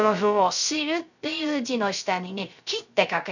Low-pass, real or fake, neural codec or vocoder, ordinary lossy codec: 7.2 kHz; fake; codec, 16 kHz, 0.3 kbps, FocalCodec; none